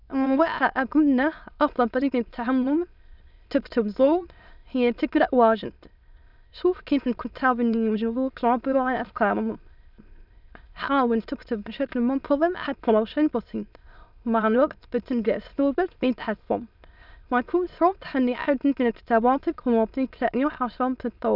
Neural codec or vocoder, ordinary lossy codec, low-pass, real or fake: autoencoder, 22.05 kHz, a latent of 192 numbers a frame, VITS, trained on many speakers; none; 5.4 kHz; fake